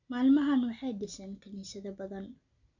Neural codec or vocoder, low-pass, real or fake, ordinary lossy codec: none; 7.2 kHz; real; none